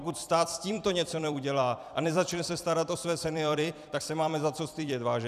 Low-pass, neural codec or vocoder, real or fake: 14.4 kHz; vocoder, 44.1 kHz, 128 mel bands every 256 samples, BigVGAN v2; fake